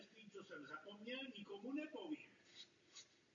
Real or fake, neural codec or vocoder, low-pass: real; none; 7.2 kHz